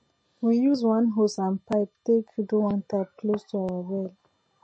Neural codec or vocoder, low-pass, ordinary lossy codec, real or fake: none; 9.9 kHz; MP3, 32 kbps; real